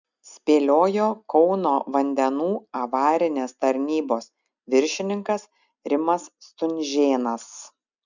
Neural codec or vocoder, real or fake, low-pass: none; real; 7.2 kHz